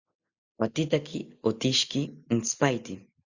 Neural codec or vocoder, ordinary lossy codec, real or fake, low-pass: none; Opus, 64 kbps; real; 7.2 kHz